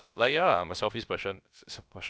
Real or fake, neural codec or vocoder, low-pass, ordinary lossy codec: fake; codec, 16 kHz, about 1 kbps, DyCAST, with the encoder's durations; none; none